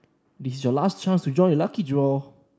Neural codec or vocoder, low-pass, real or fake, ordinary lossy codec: none; none; real; none